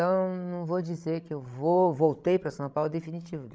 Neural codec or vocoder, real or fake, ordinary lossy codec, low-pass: codec, 16 kHz, 16 kbps, FreqCodec, larger model; fake; none; none